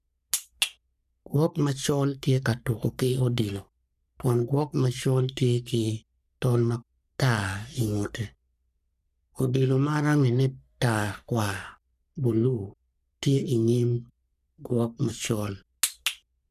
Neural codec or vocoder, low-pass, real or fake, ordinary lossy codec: codec, 44.1 kHz, 3.4 kbps, Pupu-Codec; 14.4 kHz; fake; none